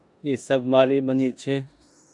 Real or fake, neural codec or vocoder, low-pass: fake; codec, 16 kHz in and 24 kHz out, 0.9 kbps, LongCat-Audio-Codec, four codebook decoder; 10.8 kHz